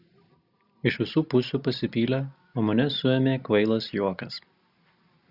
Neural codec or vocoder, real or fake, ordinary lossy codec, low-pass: none; real; Opus, 64 kbps; 5.4 kHz